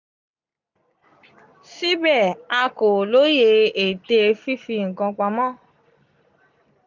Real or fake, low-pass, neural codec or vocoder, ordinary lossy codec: real; 7.2 kHz; none; none